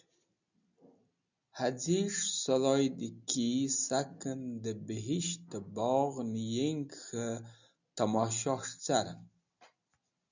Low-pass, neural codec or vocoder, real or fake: 7.2 kHz; none; real